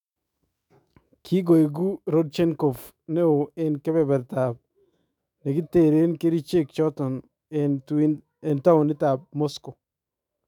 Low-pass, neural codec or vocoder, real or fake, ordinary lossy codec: 19.8 kHz; autoencoder, 48 kHz, 128 numbers a frame, DAC-VAE, trained on Japanese speech; fake; none